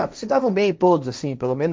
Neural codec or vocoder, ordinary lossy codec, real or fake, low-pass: codec, 16 kHz, 1.1 kbps, Voila-Tokenizer; none; fake; none